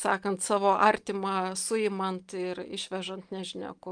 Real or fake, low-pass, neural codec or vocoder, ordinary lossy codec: real; 9.9 kHz; none; Opus, 32 kbps